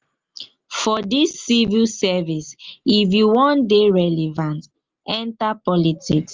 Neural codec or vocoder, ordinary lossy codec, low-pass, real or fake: none; Opus, 24 kbps; 7.2 kHz; real